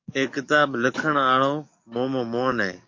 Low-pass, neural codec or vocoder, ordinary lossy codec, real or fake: 7.2 kHz; codec, 44.1 kHz, 7.8 kbps, DAC; MP3, 48 kbps; fake